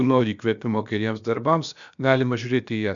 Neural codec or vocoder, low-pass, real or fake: codec, 16 kHz, about 1 kbps, DyCAST, with the encoder's durations; 7.2 kHz; fake